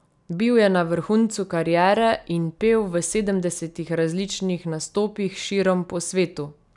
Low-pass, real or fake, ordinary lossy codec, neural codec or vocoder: 10.8 kHz; real; none; none